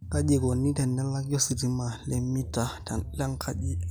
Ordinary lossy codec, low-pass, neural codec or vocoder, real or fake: none; none; none; real